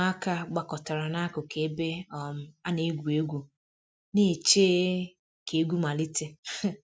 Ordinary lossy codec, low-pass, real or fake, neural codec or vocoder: none; none; real; none